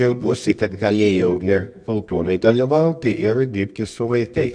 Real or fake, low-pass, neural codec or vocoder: fake; 9.9 kHz; codec, 24 kHz, 0.9 kbps, WavTokenizer, medium music audio release